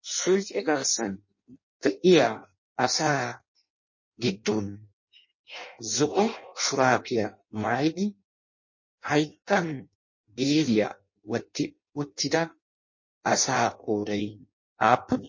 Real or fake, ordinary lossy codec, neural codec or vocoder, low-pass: fake; MP3, 32 kbps; codec, 16 kHz in and 24 kHz out, 0.6 kbps, FireRedTTS-2 codec; 7.2 kHz